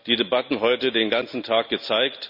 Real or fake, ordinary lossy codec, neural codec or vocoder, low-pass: real; none; none; 5.4 kHz